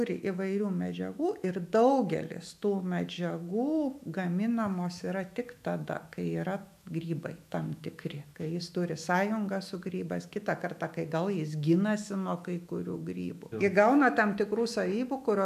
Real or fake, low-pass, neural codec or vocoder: fake; 14.4 kHz; autoencoder, 48 kHz, 128 numbers a frame, DAC-VAE, trained on Japanese speech